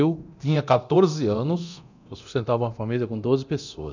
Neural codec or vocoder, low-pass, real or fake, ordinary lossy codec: codec, 24 kHz, 0.9 kbps, DualCodec; 7.2 kHz; fake; none